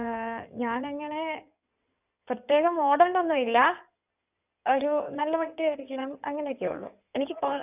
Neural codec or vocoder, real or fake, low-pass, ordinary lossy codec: codec, 16 kHz in and 24 kHz out, 2.2 kbps, FireRedTTS-2 codec; fake; 3.6 kHz; none